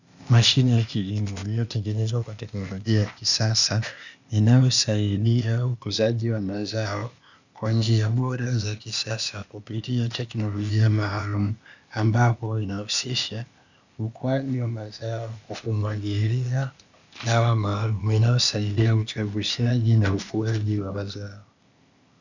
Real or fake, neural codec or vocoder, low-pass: fake; codec, 16 kHz, 0.8 kbps, ZipCodec; 7.2 kHz